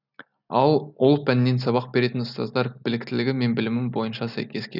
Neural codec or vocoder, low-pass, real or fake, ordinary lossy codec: none; 5.4 kHz; real; none